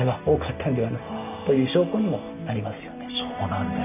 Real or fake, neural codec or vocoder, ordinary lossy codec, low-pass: real; none; none; 3.6 kHz